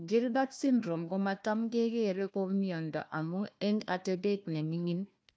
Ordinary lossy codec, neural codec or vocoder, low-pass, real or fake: none; codec, 16 kHz, 1 kbps, FunCodec, trained on Chinese and English, 50 frames a second; none; fake